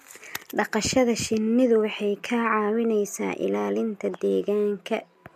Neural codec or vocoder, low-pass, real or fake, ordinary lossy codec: none; 14.4 kHz; real; MP3, 64 kbps